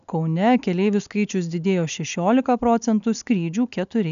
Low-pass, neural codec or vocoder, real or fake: 7.2 kHz; none; real